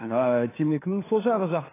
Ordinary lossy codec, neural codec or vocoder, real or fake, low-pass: AAC, 16 kbps; codec, 16 kHz, 2 kbps, FunCodec, trained on LibriTTS, 25 frames a second; fake; 3.6 kHz